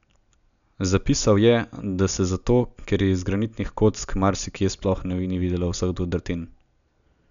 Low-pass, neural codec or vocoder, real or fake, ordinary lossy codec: 7.2 kHz; none; real; none